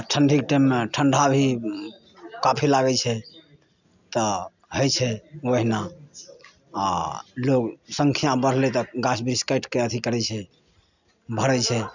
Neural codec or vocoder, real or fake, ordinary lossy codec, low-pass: none; real; none; 7.2 kHz